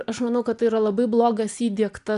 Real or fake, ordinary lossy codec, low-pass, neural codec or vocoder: real; MP3, 96 kbps; 10.8 kHz; none